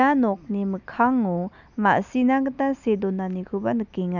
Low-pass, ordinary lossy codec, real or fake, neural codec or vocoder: 7.2 kHz; none; real; none